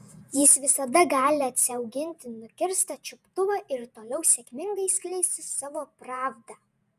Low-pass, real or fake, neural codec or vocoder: 14.4 kHz; fake; vocoder, 48 kHz, 128 mel bands, Vocos